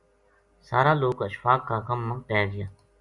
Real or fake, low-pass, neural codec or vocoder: real; 10.8 kHz; none